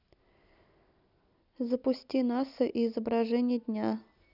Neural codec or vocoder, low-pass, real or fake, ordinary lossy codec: none; 5.4 kHz; real; none